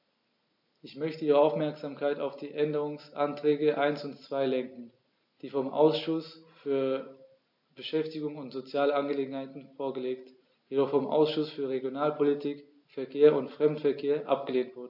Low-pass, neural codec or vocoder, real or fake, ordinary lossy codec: 5.4 kHz; none; real; AAC, 48 kbps